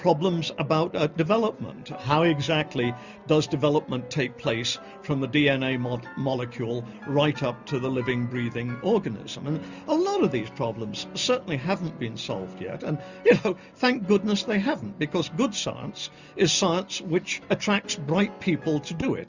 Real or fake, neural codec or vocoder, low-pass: real; none; 7.2 kHz